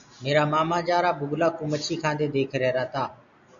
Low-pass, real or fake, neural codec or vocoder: 7.2 kHz; real; none